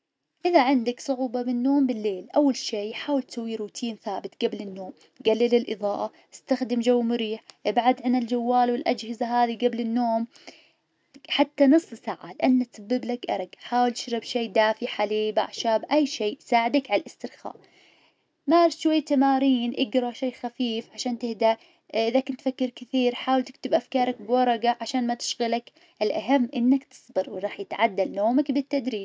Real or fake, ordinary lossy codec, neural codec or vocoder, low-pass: real; none; none; none